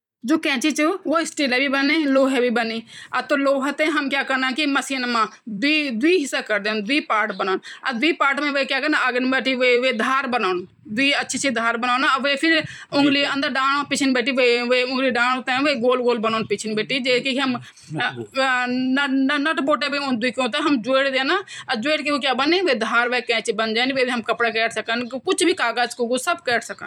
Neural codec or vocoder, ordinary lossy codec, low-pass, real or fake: vocoder, 44.1 kHz, 128 mel bands every 256 samples, BigVGAN v2; none; 19.8 kHz; fake